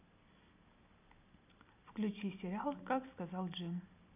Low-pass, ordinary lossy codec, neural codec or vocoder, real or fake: 3.6 kHz; none; none; real